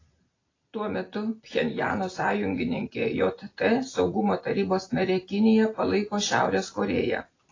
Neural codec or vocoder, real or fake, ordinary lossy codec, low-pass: none; real; AAC, 32 kbps; 7.2 kHz